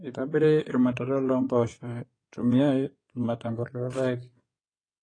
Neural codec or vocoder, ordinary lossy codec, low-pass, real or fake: codec, 16 kHz in and 24 kHz out, 2.2 kbps, FireRedTTS-2 codec; AAC, 32 kbps; 9.9 kHz; fake